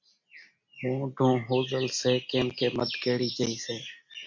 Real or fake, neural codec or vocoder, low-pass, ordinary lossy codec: real; none; 7.2 kHz; MP3, 48 kbps